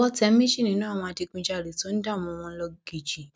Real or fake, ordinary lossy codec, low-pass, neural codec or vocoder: real; none; none; none